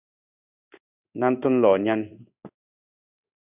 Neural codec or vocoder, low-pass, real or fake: codec, 16 kHz in and 24 kHz out, 1 kbps, XY-Tokenizer; 3.6 kHz; fake